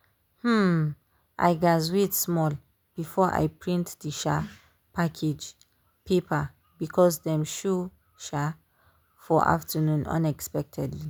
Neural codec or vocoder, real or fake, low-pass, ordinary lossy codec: none; real; none; none